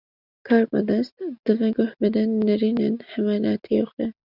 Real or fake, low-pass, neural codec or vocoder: real; 5.4 kHz; none